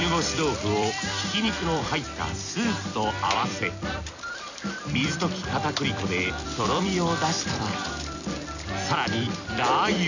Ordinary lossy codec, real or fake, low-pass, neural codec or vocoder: none; fake; 7.2 kHz; vocoder, 44.1 kHz, 128 mel bands every 256 samples, BigVGAN v2